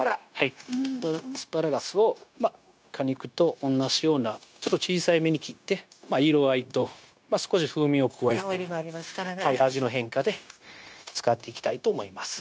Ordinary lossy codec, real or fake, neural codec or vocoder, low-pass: none; fake; codec, 16 kHz, 0.9 kbps, LongCat-Audio-Codec; none